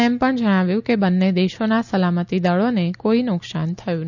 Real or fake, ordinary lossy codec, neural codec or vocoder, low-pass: real; none; none; 7.2 kHz